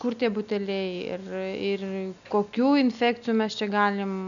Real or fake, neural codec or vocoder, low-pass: real; none; 7.2 kHz